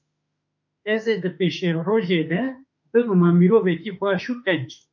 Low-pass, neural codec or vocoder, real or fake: 7.2 kHz; autoencoder, 48 kHz, 32 numbers a frame, DAC-VAE, trained on Japanese speech; fake